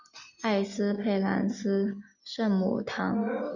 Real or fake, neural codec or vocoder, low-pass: real; none; 7.2 kHz